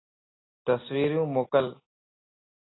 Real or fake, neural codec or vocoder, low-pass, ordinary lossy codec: real; none; 7.2 kHz; AAC, 16 kbps